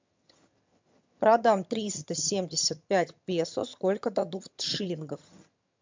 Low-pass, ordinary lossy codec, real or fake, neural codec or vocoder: 7.2 kHz; MP3, 64 kbps; fake; vocoder, 22.05 kHz, 80 mel bands, HiFi-GAN